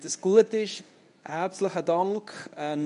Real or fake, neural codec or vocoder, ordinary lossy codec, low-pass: fake; codec, 24 kHz, 0.9 kbps, WavTokenizer, medium speech release version 1; none; 10.8 kHz